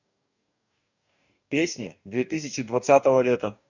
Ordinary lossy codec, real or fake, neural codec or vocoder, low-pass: none; fake; codec, 44.1 kHz, 2.6 kbps, DAC; 7.2 kHz